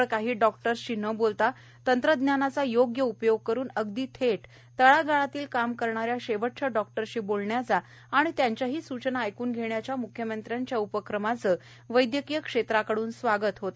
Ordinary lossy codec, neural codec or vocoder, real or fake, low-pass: none; none; real; none